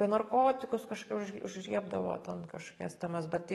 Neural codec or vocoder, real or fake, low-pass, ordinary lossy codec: codec, 44.1 kHz, 7.8 kbps, DAC; fake; 19.8 kHz; AAC, 32 kbps